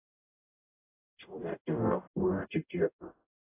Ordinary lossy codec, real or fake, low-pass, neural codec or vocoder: AAC, 16 kbps; fake; 3.6 kHz; codec, 44.1 kHz, 0.9 kbps, DAC